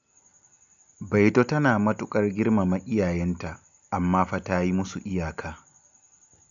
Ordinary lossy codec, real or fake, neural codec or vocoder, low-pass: none; real; none; 7.2 kHz